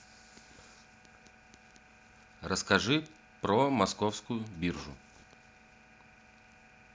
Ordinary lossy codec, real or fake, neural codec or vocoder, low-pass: none; real; none; none